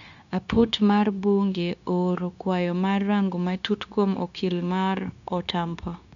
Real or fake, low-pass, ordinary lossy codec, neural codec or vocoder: fake; 7.2 kHz; none; codec, 16 kHz, 0.9 kbps, LongCat-Audio-Codec